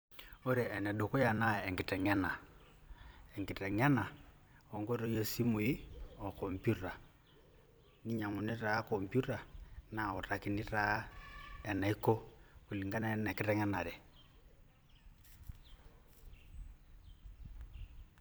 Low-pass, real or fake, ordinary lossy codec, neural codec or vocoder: none; fake; none; vocoder, 44.1 kHz, 128 mel bands every 256 samples, BigVGAN v2